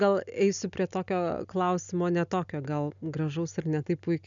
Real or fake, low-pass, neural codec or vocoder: real; 7.2 kHz; none